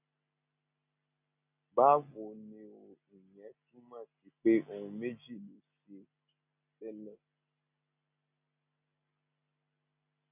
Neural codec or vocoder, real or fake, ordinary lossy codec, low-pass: none; real; none; 3.6 kHz